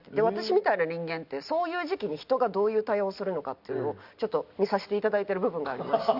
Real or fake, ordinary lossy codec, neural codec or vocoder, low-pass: real; Opus, 64 kbps; none; 5.4 kHz